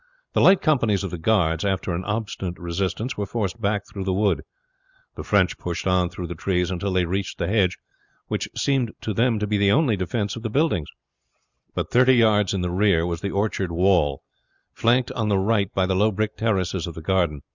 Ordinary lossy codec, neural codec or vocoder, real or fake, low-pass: Opus, 64 kbps; none; real; 7.2 kHz